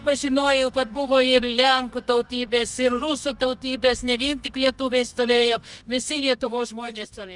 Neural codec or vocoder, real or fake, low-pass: codec, 24 kHz, 0.9 kbps, WavTokenizer, medium music audio release; fake; 10.8 kHz